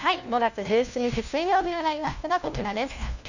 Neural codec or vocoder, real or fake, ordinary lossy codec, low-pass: codec, 16 kHz, 1 kbps, FunCodec, trained on LibriTTS, 50 frames a second; fake; none; 7.2 kHz